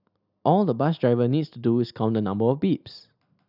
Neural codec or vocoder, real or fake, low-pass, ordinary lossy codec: none; real; 5.4 kHz; none